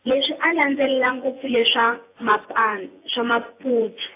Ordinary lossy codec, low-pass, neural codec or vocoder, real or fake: AAC, 24 kbps; 3.6 kHz; vocoder, 24 kHz, 100 mel bands, Vocos; fake